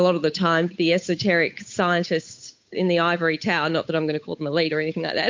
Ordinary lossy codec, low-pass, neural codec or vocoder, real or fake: MP3, 48 kbps; 7.2 kHz; codec, 16 kHz, 8 kbps, FunCodec, trained on Chinese and English, 25 frames a second; fake